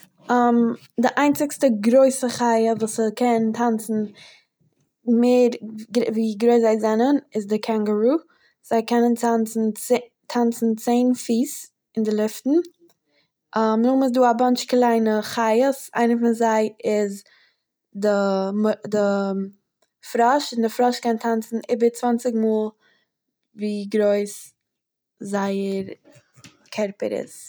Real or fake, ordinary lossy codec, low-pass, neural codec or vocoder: real; none; none; none